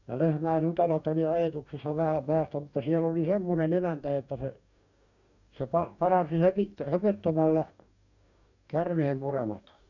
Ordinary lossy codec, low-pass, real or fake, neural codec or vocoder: none; 7.2 kHz; fake; codec, 44.1 kHz, 2.6 kbps, DAC